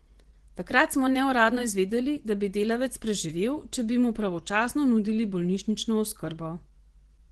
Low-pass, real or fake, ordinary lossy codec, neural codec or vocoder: 10.8 kHz; fake; Opus, 16 kbps; vocoder, 24 kHz, 100 mel bands, Vocos